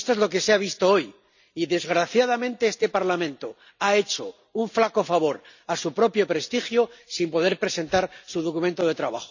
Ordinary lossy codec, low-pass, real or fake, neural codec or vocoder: none; 7.2 kHz; real; none